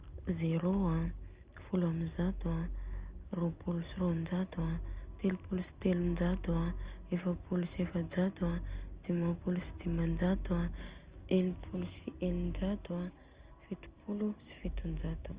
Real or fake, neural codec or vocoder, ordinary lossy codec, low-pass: real; none; Opus, 24 kbps; 3.6 kHz